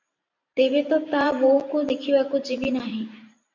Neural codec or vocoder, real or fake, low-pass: vocoder, 44.1 kHz, 128 mel bands every 512 samples, BigVGAN v2; fake; 7.2 kHz